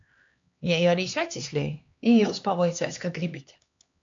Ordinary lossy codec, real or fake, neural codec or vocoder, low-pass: AAC, 64 kbps; fake; codec, 16 kHz, 2 kbps, X-Codec, HuBERT features, trained on LibriSpeech; 7.2 kHz